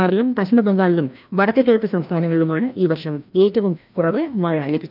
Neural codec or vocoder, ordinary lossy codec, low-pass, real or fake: codec, 16 kHz, 1 kbps, FreqCodec, larger model; none; 5.4 kHz; fake